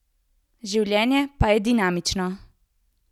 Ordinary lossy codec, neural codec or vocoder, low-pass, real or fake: none; none; 19.8 kHz; real